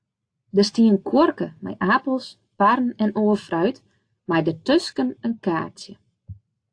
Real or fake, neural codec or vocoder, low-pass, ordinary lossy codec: real; none; 9.9 kHz; AAC, 48 kbps